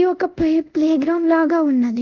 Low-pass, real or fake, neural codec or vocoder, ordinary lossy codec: 7.2 kHz; fake; codec, 24 kHz, 0.5 kbps, DualCodec; Opus, 24 kbps